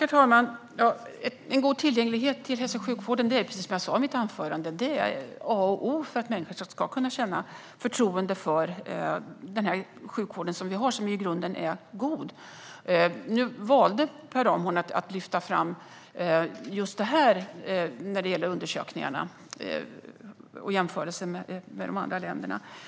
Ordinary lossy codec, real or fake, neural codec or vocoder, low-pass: none; real; none; none